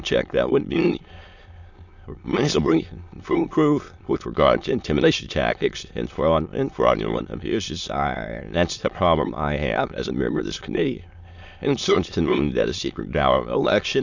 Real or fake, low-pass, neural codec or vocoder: fake; 7.2 kHz; autoencoder, 22.05 kHz, a latent of 192 numbers a frame, VITS, trained on many speakers